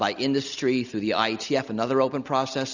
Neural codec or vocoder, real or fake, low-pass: none; real; 7.2 kHz